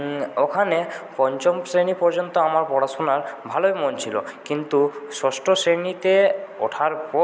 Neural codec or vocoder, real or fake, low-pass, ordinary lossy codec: none; real; none; none